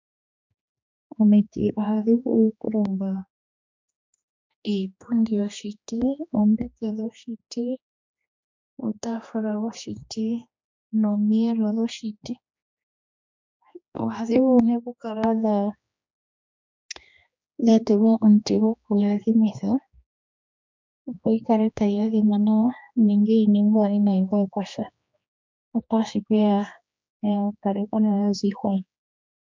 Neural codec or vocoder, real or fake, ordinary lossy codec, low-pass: codec, 16 kHz, 2 kbps, X-Codec, HuBERT features, trained on general audio; fake; MP3, 64 kbps; 7.2 kHz